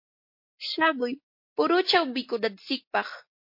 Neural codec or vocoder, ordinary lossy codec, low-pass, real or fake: none; MP3, 32 kbps; 5.4 kHz; real